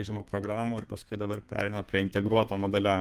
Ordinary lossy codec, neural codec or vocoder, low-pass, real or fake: Opus, 32 kbps; codec, 32 kHz, 1.9 kbps, SNAC; 14.4 kHz; fake